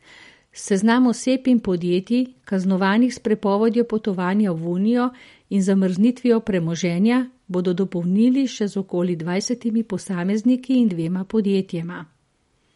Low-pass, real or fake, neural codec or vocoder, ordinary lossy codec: 19.8 kHz; real; none; MP3, 48 kbps